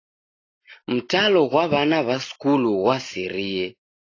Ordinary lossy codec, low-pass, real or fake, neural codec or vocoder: AAC, 32 kbps; 7.2 kHz; real; none